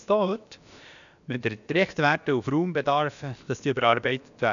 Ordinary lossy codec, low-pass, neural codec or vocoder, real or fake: none; 7.2 kHz; codec, 16 kHz, about 1 kbps, DyCAST, with the encoder's durations; fake